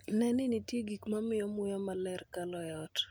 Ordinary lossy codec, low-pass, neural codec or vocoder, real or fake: none; none; none; real